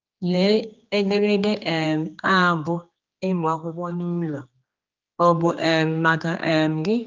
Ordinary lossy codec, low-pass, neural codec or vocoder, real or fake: Opus, 24 kbps; 7.2 kHz; codec, 16 kHz, 2 kbps, X-Codec, HuBERT features, trained on general audio; fake